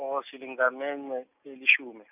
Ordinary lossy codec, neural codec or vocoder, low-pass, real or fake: none; none; 3.6 kHz; real